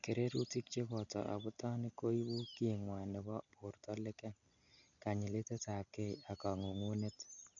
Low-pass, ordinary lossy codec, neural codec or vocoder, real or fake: 7.2 kHz; none; none; real